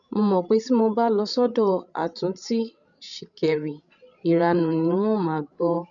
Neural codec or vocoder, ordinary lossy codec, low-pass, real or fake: codec, 16 kHz, 16 kbps, FreqCodec, larger model; none; 7.2 kHz; fake